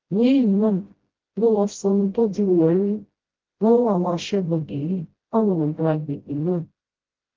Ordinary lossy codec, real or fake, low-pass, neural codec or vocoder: Opus, 16 kbps; fake; 7.2 kHz; codec, 16 kHz, 0.5 kbps, FreqCodec, smaller model